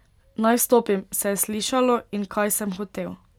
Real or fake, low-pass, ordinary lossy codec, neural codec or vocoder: real; 19.8 kHz; none; none